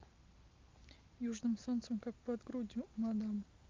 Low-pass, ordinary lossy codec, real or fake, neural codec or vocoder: 7.2 kHz; Opus, 24 kbps; real; none